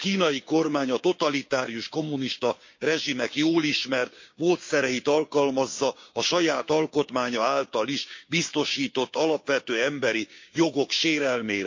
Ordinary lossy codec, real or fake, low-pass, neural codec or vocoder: MP3, 48 kbps; fake; 7.2 kHz; codec, 16 kHz, 6 kbps, DAC